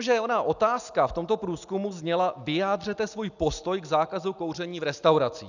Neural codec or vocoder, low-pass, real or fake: none; 7.2 kHz; real